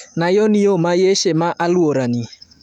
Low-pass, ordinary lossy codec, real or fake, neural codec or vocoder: 19.8 kHz; none; fake; autoencoder, 48 kHz, 128 numbers a frame, DAC-VAE, trained on Japanese speech